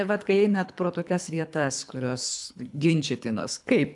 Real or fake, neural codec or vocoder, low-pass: fake; codec, 24 kHz, 3 kbps, HILCodec; 10.8 kHz